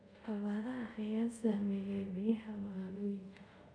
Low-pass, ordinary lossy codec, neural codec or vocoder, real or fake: 9.9 kHz; none; codec, 24 kHz, 0.5 kbps, DualCodec; fake